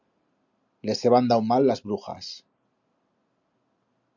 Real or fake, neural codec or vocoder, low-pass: real; none; 7.2 kHz